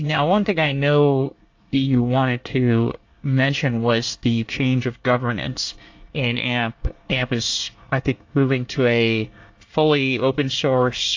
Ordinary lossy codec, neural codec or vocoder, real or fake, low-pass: AAC, 48 kbps; codec, 24 kHz, 1 kbps, SNAC; fake; 7.2 kHz